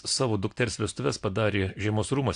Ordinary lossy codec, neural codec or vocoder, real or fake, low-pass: AAC, 64 kbps; none; real; 9.9 kHz